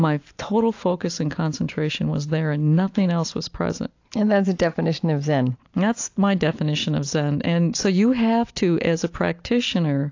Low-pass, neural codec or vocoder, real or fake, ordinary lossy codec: 7.2 kHz; none; real; AAC, 48 kbps